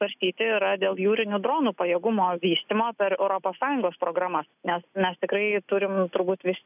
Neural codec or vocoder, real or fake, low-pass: none; real; 3.6 kHz